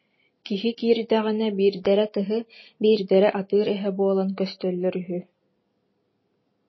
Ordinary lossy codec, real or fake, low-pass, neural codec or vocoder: MP3, 24 kbps; real; 7.2 kHz; none